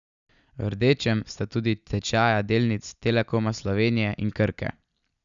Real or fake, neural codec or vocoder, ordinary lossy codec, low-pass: real; none; none; 7.2 kHz